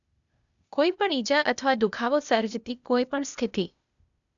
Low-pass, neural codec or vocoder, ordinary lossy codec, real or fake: 7.2 kHz; codec, 16 kHz, 0.8 kbps, ZipCodec; none; fake